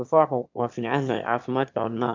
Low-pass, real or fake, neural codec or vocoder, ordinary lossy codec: 7.2 kHz; fake; autoencoder, 22.05 kHz, a latent of 192 numbers a frame, VITS, trained on one speaker; MP3, 64 kbps